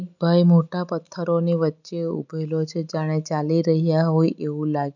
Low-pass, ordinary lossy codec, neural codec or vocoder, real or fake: 7.2 kHz; none; none; real